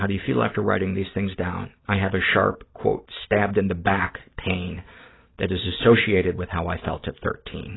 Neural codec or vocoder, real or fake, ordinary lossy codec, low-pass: none; real; AAC, 16 kbps; 7.2 kHz